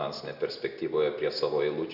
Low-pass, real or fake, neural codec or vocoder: 5.4 kHz; real; none